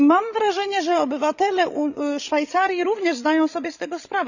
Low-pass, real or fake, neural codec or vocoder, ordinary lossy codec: 7.2 kHz; fake; codec, 16 kHz, 16 kbps, FreqCodec, larger model; none